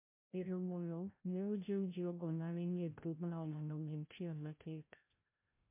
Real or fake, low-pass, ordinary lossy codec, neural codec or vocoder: fake; 3.6 kHz; MP3, 24 kbps; codec, 16 kHz, 0.5 kbps, FreqCodec, larger model